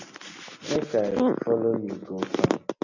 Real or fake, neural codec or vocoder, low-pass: real; none; 7.2 kHz